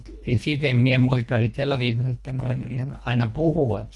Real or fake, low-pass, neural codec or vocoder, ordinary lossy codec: fake; none; codec, 24 kHz, 1.5 kbps, HILCodec; none